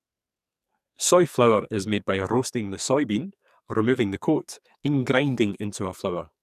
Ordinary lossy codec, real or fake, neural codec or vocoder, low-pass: none; fake; codec, 44.1 kHz, 2.6 kbps, SNAC; 14.4 kHz